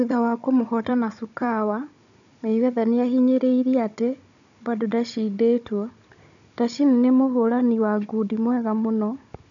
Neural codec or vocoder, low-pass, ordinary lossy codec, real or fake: codec, 16 kHz, 16 kbps, FunCodec, trained on Chinese and English, 50 frames a second; 7.2 kHz; none; fake